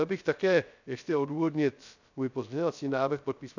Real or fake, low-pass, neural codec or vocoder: fake; 7.2 kHz; codec, 16 kHz, 0.3 kbps, FocalCodec